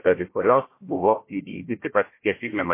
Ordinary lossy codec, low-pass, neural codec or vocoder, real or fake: MP3, 24 kbps; 3.6 kHz; codec, 16 kHz, 1 kbps, FunCodec, trained on Chinese and English, 50 frames a second; fake